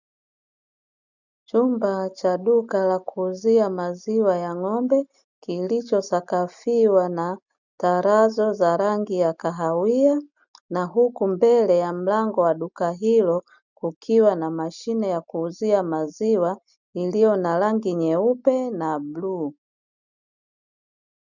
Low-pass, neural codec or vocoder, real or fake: 7.2 kHz; none; real